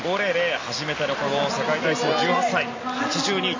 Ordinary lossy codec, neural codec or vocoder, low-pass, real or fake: MP3, 32 kbps; none; 7.2 kHz; real